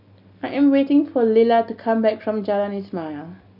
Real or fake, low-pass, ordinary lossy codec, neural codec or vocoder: real; 5.4 kHz; none; none